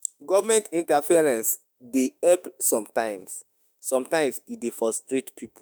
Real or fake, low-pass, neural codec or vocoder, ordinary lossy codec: fake; none; autoencoder, 48 kHz, 32 numbers a frame, DAC-VAE, trained on Japanese speech; none